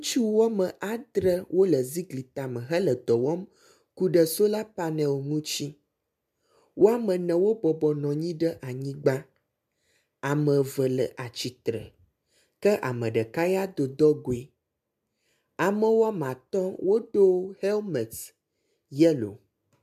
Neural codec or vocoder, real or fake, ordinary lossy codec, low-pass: none; real; MP3, 96 kbps; 14.4 kHz